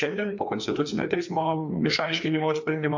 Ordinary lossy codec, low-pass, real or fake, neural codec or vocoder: MP3, 64 kbps; 7.2 kHz; fake; codec, 16 kHz, 2 kbps, FreqCodec, larger model